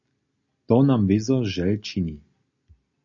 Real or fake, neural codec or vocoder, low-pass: real; none; 7.2 kHz